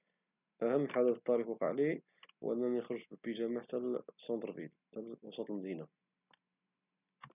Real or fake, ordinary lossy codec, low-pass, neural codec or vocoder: real; none; 3.6 kHz; none